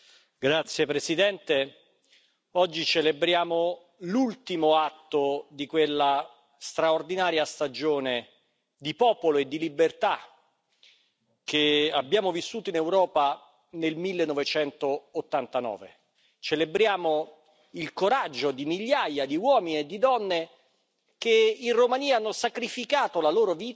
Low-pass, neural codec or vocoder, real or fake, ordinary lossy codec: none; none; real; none